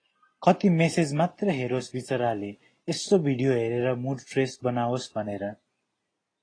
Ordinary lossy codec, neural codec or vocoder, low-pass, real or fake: AAC, 32 kbps; none; 9.9 kHz; real